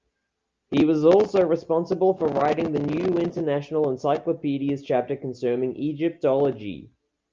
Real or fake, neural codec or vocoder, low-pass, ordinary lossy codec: real; none; 7.2 kHz; Opus, 24 kbps